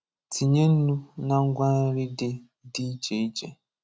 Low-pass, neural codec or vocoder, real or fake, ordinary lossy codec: none; none; real; none